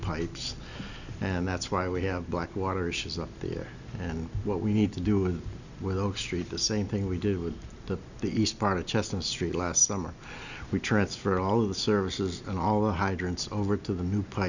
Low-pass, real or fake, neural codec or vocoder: 7.2 kHz; real; none